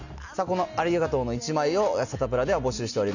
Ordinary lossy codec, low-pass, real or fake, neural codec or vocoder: none; 7.2 kHz; real; none